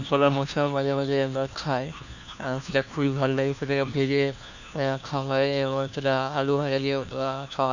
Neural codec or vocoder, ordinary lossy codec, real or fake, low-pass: codec, 16 kHz, 1 kbps, FunCodec, trained on LibriTTS, 50 frames a second; none; fake; 7.2 kHz